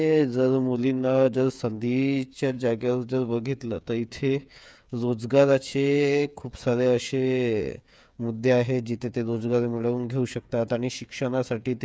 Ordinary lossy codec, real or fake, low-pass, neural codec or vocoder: none; fake; none; codec, 16 kHz, 8 kbps, FreqCodec, smaller model